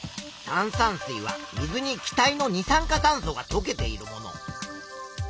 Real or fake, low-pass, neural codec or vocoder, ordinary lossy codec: real; none; none; none